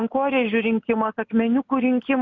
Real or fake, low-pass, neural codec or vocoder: real; 7.2 kHz; none